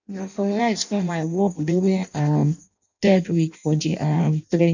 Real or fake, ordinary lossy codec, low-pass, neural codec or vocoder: fake; none; 7.2 kHz; codec, 16 kHz in and 24 kHz out, 0.6 kbps, FireRedTTS-2 codec